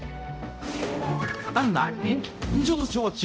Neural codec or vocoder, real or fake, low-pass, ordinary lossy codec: codec, 16 kHz, 0.5 kbps, X-Codec, HuBERT features, trained on balanced general audio; fake; none; none